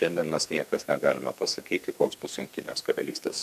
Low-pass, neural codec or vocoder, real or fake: 14.4 kHz; codec, 32 kHz, 1.9 kbps, SNAC; fake